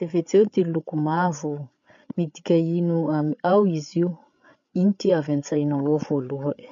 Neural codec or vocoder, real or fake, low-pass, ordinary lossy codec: codec, 16 kHz, 8 kbps, FreqCodec, larger model; fake; 7.2 kHz; MP3, 48 kbps